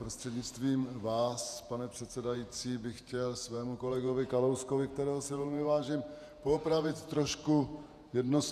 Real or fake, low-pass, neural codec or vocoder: fake; 14.4 kHz; vocoder, 48 kHz, 128 mel bands, Vocos